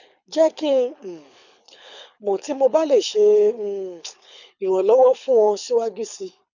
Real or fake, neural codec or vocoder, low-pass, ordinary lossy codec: fake; codec, 24 kHz, 6 kbps, HILCodec; 7.2 kHz; none